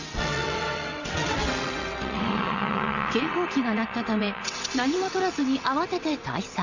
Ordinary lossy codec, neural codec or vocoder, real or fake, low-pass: Opus, 64 kbps; vocoder, 22.05 kHz, 80 mel bands, WaveNeXt; fake; 7.2 kHz